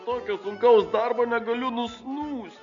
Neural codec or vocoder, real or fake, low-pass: none; real; 7.2 kHz